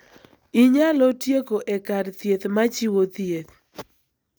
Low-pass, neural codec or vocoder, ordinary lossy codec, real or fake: none; none; none; real